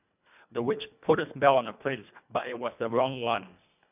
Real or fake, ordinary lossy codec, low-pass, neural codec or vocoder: fake; none; 3.6 kHz; codec, 24 kHz, 1.5 kbps, HILCodec